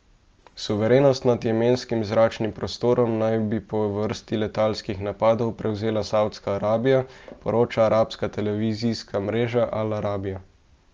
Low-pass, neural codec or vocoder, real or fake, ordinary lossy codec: 7.2 kHz; none; real; Opus, 24 kbps